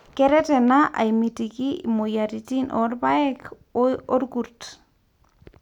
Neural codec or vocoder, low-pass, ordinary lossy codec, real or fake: none; 19.8 kHz; none; real